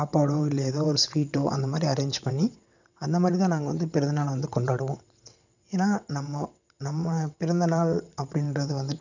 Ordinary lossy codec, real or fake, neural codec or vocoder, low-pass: none; fake; vocoder, 22.05 kHz, 80 mel bands, WaveNeXt; 7.2 kHz